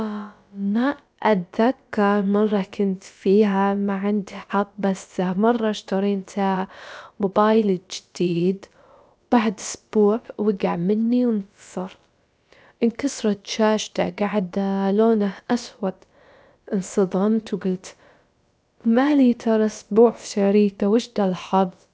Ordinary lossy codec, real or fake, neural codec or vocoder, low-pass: none; fake; codec, 16 kHz, about 1 kbps, DyCAST, with the encoder's durations; none